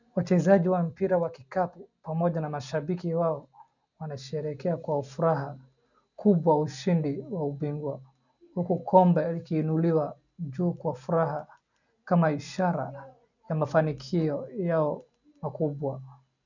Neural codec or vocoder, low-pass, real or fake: none; 7.2 kHz; real